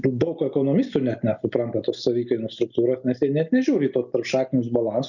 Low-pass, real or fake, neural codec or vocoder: 7.2 kHz; real; none